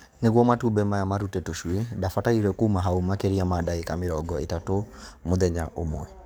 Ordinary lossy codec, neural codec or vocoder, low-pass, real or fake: none; codec, 44.1 kHz, 7.8 kbps, DAC; none; fake